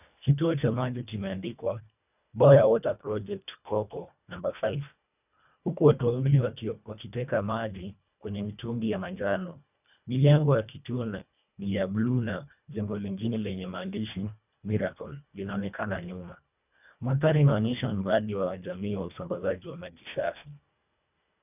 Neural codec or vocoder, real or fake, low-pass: codec, 24 kHz, 1.5 kbps, HILCodec; fake; 3.6 kHz